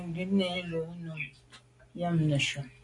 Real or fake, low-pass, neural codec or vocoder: real; 10.8 kHz; none